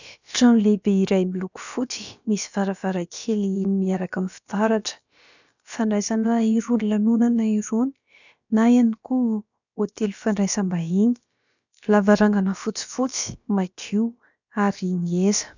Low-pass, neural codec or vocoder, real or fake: 7.2 kHz; codec, 16 kHz, about 1 kbps, DyCAST, with the encoder's durations; fake